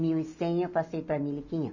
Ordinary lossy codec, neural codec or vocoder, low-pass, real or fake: none; none; 7.2 kHz; real